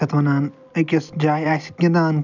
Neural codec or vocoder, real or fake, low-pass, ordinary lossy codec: none; real; 7.2 kHz; none